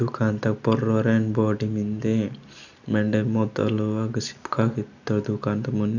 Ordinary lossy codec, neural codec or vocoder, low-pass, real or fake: none; none; 7.2 kHz; real